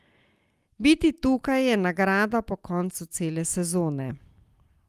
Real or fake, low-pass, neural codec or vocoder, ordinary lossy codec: real; 14.4 kHz; none; Opus, 32 kbps